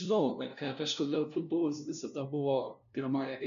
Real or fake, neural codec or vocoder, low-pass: fake; codec, 16 kHz, 0.5 kbps, FunCodec, trained on LibriTTS, 25 frames a second; 7.2 kHz